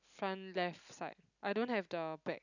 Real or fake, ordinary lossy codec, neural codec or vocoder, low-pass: real; none; none; 7.2 kHz